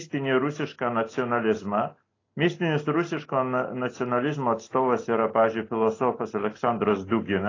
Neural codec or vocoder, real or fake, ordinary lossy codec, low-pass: none; real; AAC, 32 kbps; 7.2 kHz